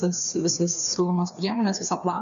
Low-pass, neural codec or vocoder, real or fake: 7.2 kHz; codec, 16 kHz, 2 kbps, FreqCodec, larger model; fake